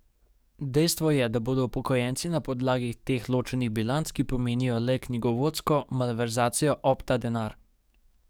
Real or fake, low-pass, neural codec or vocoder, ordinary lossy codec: fake; none; codec, 44.1 kHz, 7.8 kbps, DAC; none